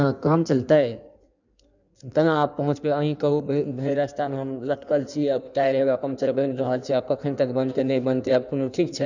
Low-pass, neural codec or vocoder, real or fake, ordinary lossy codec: 7.2 kHz; codec, 16 kHz in and 24 kHz out, 1.1 kbps, FireRedTTS-2 codec; fake; none